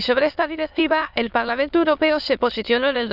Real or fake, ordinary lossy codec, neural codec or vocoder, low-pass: fake; none; autoencoder, 22.05 kHz, a latent of 192 numbers a frame, VITS, trained on many speakers; 5.4 kHz